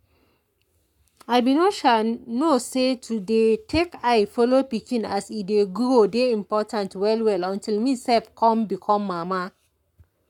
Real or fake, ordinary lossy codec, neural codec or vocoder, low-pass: fake; none; codec, 44.1 kHz, 7.8 kbps, Pupu-Codec; 19.8 kHz